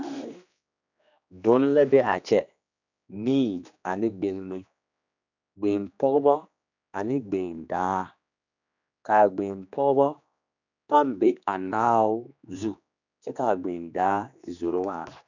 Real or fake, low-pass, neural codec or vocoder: fake; 7.2 kHz; codec, 16 kHz, 2 kbps, X-Codec, HuBERT features, trained on general audio